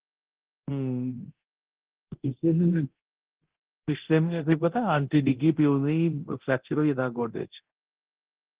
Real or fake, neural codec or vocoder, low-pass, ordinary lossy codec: fake; codec, 24 kHz, 0.9 kbps, DualCodec; 3.6 kHz; Opus, 16 kbps